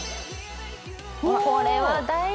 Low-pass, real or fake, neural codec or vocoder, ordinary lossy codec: none; real; none; none